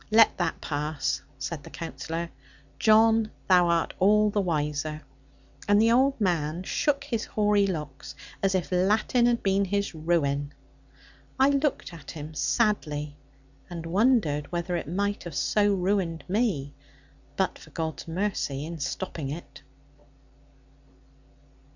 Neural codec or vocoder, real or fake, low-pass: none; real; 7.2 kHz